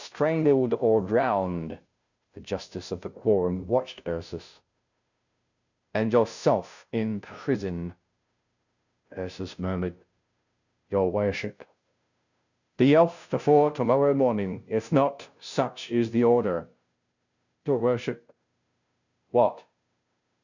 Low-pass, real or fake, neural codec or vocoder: 7.2 kHz; fake; codec, 16 kHz, 0.5 kbps, FunCodec, trained on Chinese and English, 25 frames a second